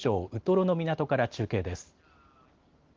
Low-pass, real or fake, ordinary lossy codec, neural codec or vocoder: 7.2 kHz; real; Opus, 16 kbps; none